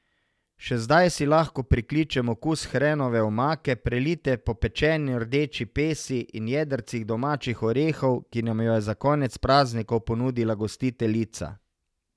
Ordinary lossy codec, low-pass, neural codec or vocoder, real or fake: none; none; none; real